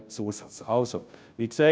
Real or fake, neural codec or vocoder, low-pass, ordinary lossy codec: fake; codec, 16 kHz, 0.5 kbps, FunCodec, trained on Chinese and English, 25 frames a second; none; none